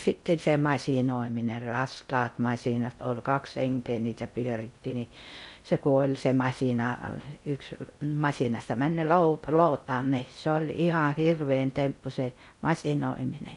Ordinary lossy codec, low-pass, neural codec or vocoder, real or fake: none; 10.8 kHz; codec, 16 kHz in and 24 kHz out, 0.6 kbps, FocalCodec, streaming, 4096 codes; fake